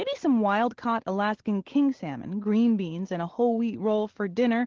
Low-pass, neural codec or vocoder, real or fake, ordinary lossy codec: 7.2 kHz; none; real; Opus, 16 kbps